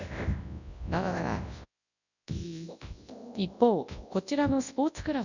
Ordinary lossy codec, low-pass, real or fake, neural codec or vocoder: none; 7.2 kHz; fake; codec, 24 kHz, 0.9 kbps, WavTokenizer, large speech release